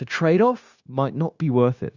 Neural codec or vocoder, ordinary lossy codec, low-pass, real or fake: codec, 16 kHz, 0.9 kbps, LongCat-Audio-Codec; Opus, 64 kbps; 7.2 kHz; fake